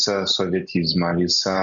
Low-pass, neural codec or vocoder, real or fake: 7.2 kHz; none; real